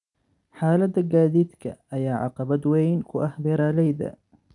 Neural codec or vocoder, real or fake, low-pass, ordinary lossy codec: none; real; 10.8 kHz; none